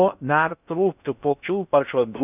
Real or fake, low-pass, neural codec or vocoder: fake; 3.6 kHz; codec, 16 kHz in and 24 kHz out, 0.6 kbps, FocalCodec, streaming, 4096 codes